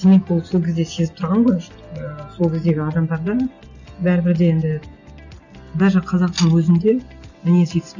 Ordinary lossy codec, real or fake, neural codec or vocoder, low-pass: none; real; none; 7.2 kHz